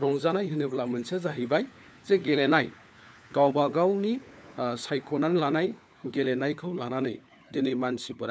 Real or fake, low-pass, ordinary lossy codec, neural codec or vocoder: fake; none; none; codec, 16 kHz, 4 kbps, FunCodec, trained on LibriTTS, 50 frames a second